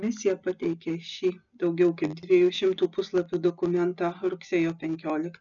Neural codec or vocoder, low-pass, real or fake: none; 7.2 kHz; real